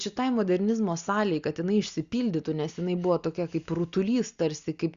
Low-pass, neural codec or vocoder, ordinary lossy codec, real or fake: 7.2 kHz; none; Opus, 64 kbps; real